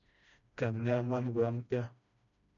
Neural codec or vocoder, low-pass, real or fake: codec, 16 kHz, 1 kbps, FreqCodec, smaller model; 7.2 kHz; fake